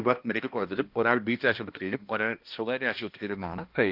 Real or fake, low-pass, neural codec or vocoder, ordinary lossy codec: fake; 5.4 kHz; codec, 16 kHz, 1 kbps, X-Codec, HuBERT features, trained on balanced general audio; Opus, 24 kbps